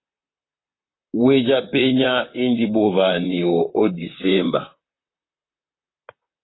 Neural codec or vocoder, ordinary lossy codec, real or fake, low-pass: vocoder, 44.1 kHz, 128 mel bands, Pupu-Vocoder; AAC, 16 kbps; fake; 7.2 kHz